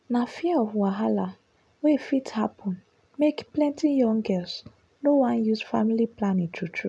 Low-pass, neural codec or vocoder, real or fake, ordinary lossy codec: none; none; real; none